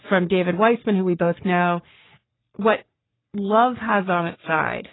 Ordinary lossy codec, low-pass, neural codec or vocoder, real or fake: AAC, 16 kbps; 7.2 kHz; codec, 44.1 kHz, 3.4 kbps, Pupu-Codec; fake